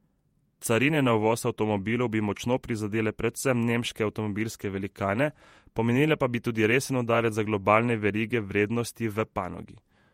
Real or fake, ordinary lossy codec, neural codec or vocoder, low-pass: fake; MP3, 64 kbps; vocoder, 48 kHz, 128 mel bands, Vocos; 19.8 kHz